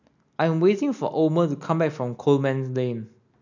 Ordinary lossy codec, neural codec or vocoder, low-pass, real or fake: none; none; 7.2 kHz; real